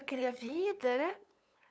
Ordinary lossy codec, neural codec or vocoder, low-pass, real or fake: none; codec, 16 kHz, 8 kbps, FunCodec, trained on LibriTTS, 25 frames a second; none; fake